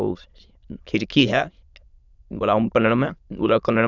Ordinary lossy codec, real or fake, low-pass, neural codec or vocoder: none; fake; 7.2 kHz; autoencoder, 22.05 kHz, a latent of 192 numbers a frame, VITS, trained on many speakers